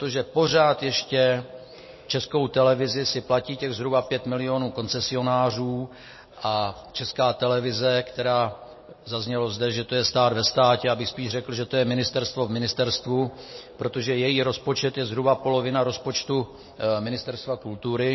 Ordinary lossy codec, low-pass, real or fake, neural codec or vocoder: MP3, 24 kbps; 7.2 kHz; real; none